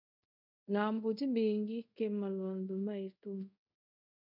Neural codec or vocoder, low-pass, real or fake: codec, 24 kHz, 0.5 kbps, DualCodec; 5.4 kHz; fake